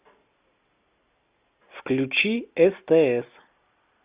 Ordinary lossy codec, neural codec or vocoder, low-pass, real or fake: Opus, 64 kbps; none; 3.6 kHz; real